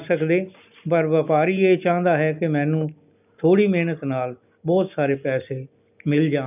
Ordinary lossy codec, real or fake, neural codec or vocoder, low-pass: none; real; none; 3.6 kHz